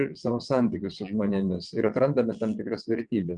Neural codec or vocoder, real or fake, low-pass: vocoder, 22.05 kHz, 80 mel bands, WaveNeXt; fake; 9.9 kHz